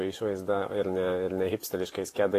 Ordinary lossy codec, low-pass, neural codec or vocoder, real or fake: AAC, 48 kbps; 14.4 kHz; vocoder, 44.1 kHz, 128 mel bands every 512 samples, BigVGAN v2; fake